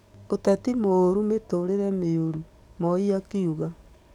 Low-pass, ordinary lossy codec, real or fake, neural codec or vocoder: 19.8 kHz; none; fake; codec, 44.1 kHz, 7.8 kbps, DAC